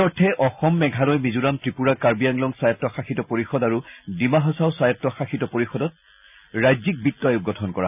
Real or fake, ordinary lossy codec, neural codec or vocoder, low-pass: real; none; none; 3.6 kHz